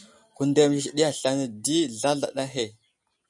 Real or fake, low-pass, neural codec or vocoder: real; 10.8 kHz; none